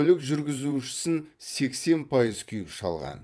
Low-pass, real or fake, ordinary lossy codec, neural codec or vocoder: none; fake; none; vocoder, 22.05 kHz, 80 mel bands, WaveNeXt